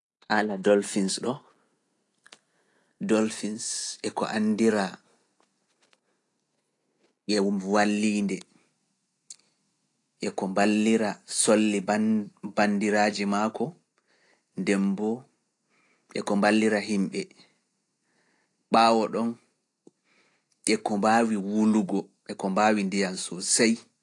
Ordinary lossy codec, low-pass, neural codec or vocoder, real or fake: AAC, 48 kbps; 10.8 kHz; none; real